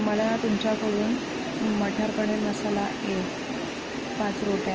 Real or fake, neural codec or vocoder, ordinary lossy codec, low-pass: real; none; Opus, 24 kbps; 7.2 kHz